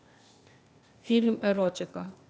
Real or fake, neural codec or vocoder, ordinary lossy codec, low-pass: fake; codec, 16 kHz, 0.8 kbps, ZipCodec; none; none